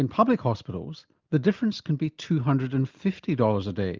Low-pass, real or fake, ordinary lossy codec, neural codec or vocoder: 7.2 kHz; real; Opus, 24 kbps; none